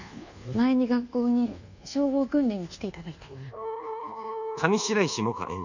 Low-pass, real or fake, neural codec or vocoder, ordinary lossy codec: 7.2 kHz; fake; codec, 24 kHz, 1.2 kbps, DualCodec; none